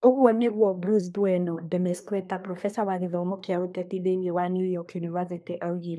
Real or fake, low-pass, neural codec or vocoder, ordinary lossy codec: fake; none; codec, 24 kHz, 1 kbps, SNAC; none